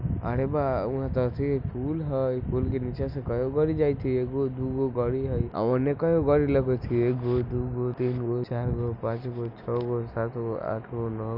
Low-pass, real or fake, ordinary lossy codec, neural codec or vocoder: 5.4 kHz; real; none; none